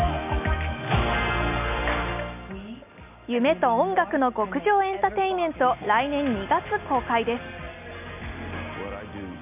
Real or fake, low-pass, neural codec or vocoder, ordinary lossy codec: real; 3.6 kHz; none; none